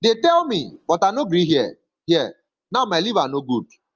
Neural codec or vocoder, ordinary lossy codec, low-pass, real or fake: none; Opus, 24 kbps; 7.2 kHz; real